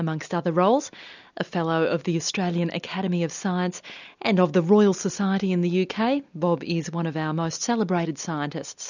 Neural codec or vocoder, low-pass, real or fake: none; 7.2 kHz; real